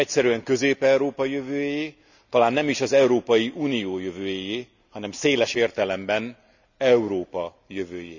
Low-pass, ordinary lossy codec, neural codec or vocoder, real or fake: 7.2 kHz; none; none; real